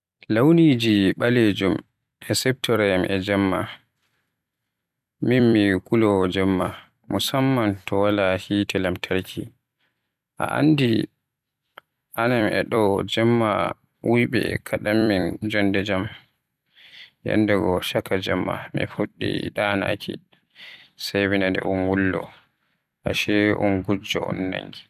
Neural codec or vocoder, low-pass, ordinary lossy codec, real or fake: vocoder, 44.1 kHz, 128 mel bands every 256 samples, BigVGAN v2; 14.4 kHz; none; fake